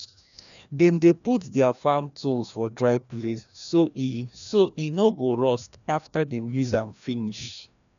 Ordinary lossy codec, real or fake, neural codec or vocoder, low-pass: none; fake; codec, 16 kHz, 1 kbps, FreqCodec, larger model; 7.2 kHz